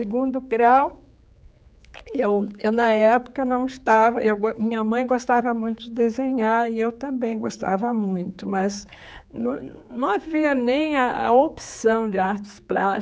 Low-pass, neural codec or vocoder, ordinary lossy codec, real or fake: none; codec, 16 kHz, 4 kbps, X-Codec, HuBERT features, trained on general audio; none; fake